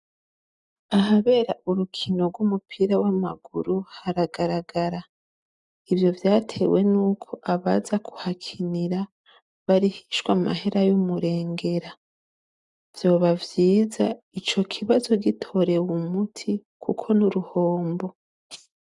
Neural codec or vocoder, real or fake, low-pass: none; real; 10.8 kHz